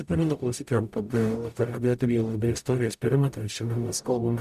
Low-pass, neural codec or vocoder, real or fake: 14.4 kHz; codec, 44.1 kHz, 0.9 kbps, DAC; fake